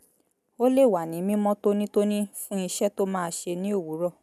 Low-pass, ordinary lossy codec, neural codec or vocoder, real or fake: 14.4 kHz; none; none; real